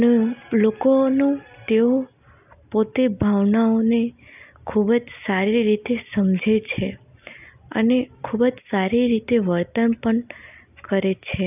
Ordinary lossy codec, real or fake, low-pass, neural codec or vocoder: none; real; 3.6 kHz; none